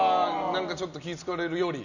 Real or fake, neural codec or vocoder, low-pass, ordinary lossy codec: real; none; 7.2 kHz; none